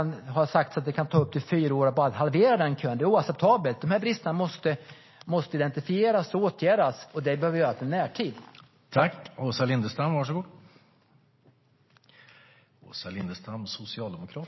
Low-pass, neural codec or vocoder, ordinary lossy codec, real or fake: 7.2 kHz; none; MP3, 24 kbps; real